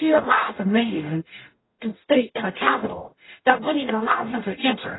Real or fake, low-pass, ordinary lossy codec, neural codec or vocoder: fake; 7.2 kHz; AAC, 16 kbps; codec, 44.1 kHz, 0.9 kbps, DAC